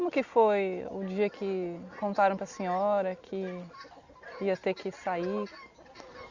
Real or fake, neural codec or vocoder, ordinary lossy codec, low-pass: real; none; Opus, 64 kbps; 7.2 kHz